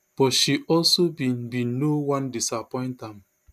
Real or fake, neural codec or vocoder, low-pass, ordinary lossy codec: real; none; 14.4 kHz; none